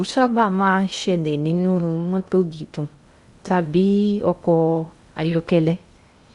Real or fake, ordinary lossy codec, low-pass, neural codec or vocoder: fake; Opus, 64 kbps; 10.8 kHz; codec, 16 kHz in and 24 kHz out, 0.6 kbps, FocalCodec, streaming, 2048 codes